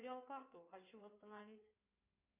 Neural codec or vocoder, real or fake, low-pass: codec, 16 kHz in and 24 kHz out, 2.2 kbps, FireRedTTS-2 codec; fake; 3.6 kHz